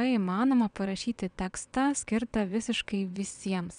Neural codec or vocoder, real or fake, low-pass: vocoder, 22.05 kHz, 80 mel bands, WaveNeXt; fake; 9.9 kHz